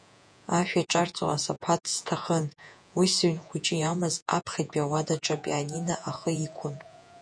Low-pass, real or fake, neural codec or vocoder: 9.9 kHz; fake; vocoder, 48 kHz, 128 mel bands, Vocos